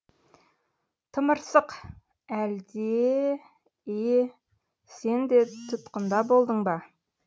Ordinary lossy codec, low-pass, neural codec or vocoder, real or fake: none; none; none; real